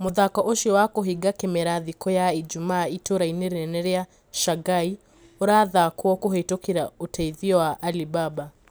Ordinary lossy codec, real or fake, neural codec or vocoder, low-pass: none; real; none; none